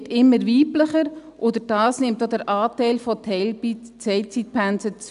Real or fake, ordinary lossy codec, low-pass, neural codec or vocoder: real; none; 10.8 kHz; none